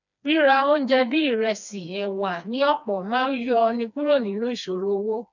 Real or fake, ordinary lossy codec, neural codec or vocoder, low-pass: fake; none; codec, 16 kHz, 2 kbps, FreqCodec, smaller model; 7.2 kHz